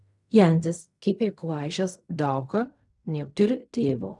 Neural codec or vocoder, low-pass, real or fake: codec, 16 kHz in and 24 kHz out, 0.4 kbps, LongCat-Audio-Codec, fine tuned four codebook decoder; 10.8 kHz; fake